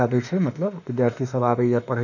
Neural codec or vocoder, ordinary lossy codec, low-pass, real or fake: autoencoder, 48 kHz, 32 numbers a frame, DAC-VAE, trained on Japanese speech; none; 7.2 kHz; fake